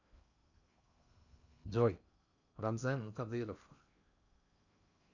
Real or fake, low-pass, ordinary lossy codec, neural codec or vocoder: fake; 7.2 kHz; none; codec, 16 kHz in and 24 kHz out, 0.8 kbps, FocalCodec, streaming, 65536 codes